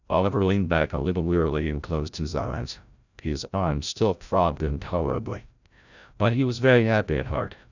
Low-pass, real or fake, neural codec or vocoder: 7.2 kHz; fake; codec, 16 kHz, 0.5 kbps, FreqCodec, larger model